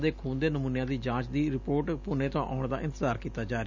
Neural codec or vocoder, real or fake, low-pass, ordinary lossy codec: none; real; 7.2 kHz; none